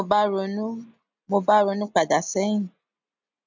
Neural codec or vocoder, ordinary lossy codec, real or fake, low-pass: none; MP3, 64 kbps; real; 7.2 kHz